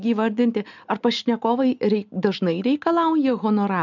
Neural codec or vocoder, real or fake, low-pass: none; real; 7.2 kHz